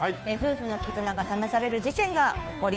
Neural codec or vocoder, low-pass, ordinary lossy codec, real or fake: codec, 16 kHz, 2 kbps, FunCodec, trained on Chinese and English, 25 frames a second; none; none; fake